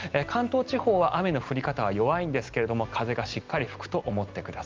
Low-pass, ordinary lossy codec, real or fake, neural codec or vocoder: 7.2 kHz; Opus, 32 kbps; real; none